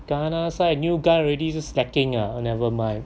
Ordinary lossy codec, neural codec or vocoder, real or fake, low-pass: none; none; real; none